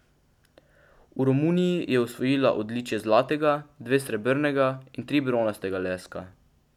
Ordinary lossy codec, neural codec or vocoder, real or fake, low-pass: none; none; real; 19.8 kHz